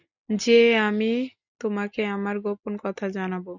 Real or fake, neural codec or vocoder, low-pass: real; none; 7.2 kHz